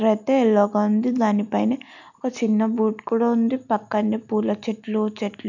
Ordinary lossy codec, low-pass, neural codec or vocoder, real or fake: none; 7.2 kHz; none; real